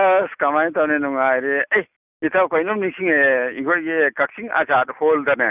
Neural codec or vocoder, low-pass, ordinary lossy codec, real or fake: none; 3.6 kHz; none; real